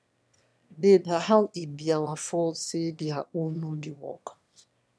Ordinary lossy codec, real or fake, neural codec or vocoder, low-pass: none; fake; autoencoder, 22.05 kHz, a latent of 192 numbers a frame, VITS, trained on one speaker; none